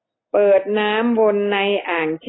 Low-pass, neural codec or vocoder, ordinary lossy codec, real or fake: 7.2 kHz; none; AAC, 16 kbps; real